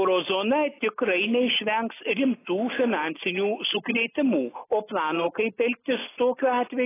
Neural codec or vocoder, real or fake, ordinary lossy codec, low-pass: none; real; AAC, 16 kbps; 3.6 kHz